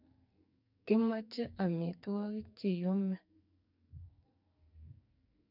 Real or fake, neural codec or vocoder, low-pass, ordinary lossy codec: fake; codec, 44.1 kHz, 2.6 kbps, SNAC; 5.4 kHz; none